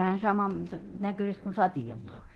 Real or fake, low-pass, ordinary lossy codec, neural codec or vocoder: fake; 10.8 kHz; Opus, 16 kbps; codec, 24 kHz, 0.9 kbps, DualCodec